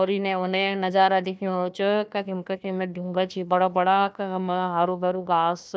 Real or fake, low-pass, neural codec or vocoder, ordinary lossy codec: fake; none; codec, 16 kHz, 1 kbps, FunCodec, trained on Chinese and English, 50 frames a second; none